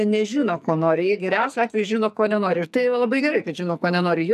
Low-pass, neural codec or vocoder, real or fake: 14.4 kHz; codec, 44.1 kHz, 2.6 kbps, SNAC; fake